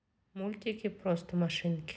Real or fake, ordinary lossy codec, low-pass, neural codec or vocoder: real; none; none; none